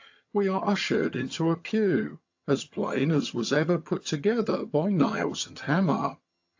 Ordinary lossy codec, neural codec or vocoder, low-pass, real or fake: AAC, 48 kbps; vocoder, 22.05 kHz, 80 mel bands, HiFi-GAN; 7.2 kHz; fake